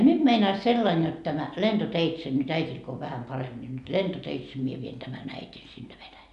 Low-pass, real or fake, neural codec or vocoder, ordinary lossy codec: 10.8 kHz; real; none; none